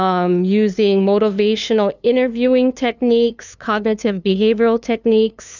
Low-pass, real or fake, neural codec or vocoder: 7.2 kHz; fake; codec, 16 kHz, 2 kbps, FunCodec, trained on LibriTTS, 25 frames a second